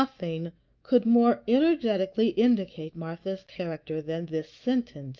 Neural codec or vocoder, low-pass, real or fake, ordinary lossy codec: autoencoder, 48 kHz, 128 numbers a frame, DAC-VAE, trained on Japanese speech; 7.2 kHz; fake; Opus, 24 kbps